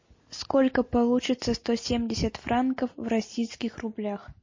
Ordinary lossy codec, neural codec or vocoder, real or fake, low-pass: MP3, 32 kbps; none; real; 7.2 kHz